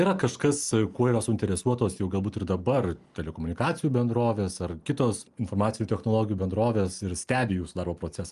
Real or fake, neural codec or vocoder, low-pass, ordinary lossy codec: real; none; 10.8 kHz; Opus, 32 kbps